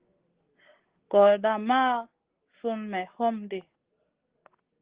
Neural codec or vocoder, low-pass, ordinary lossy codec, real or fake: none; 3.6 kHz; Opus, 16 kbps; real